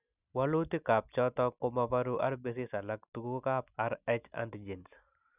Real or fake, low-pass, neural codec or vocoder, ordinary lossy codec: real; 3.6 kHz; none; none